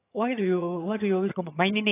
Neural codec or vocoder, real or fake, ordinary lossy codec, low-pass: vocoder, 22.05 kHz, 80 mel bands, HiFi-GAN; fake; AAC, 24 kbps; 3.6 kHz